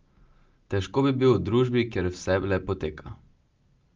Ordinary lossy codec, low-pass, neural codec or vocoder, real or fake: Opus, 32 kbps; 7.2 kHz; none; real